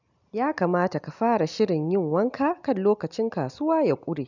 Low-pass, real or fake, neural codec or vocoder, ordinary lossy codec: 7.2 kHz; real; none; none